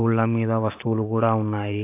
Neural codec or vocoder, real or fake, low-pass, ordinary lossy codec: vocoder, 44.1 kHz, 128 mel bands every 512 samples, BigVGAN v2; fake; 3.6 kHz; AAC, 24 kbps